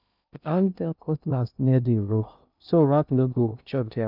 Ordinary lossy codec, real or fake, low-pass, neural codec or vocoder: none; fake; 5.4 kHz; codec, 16 kHz in and 24 kHz out, 0.6 kbps, FocalCodec, streaming, 2048 codes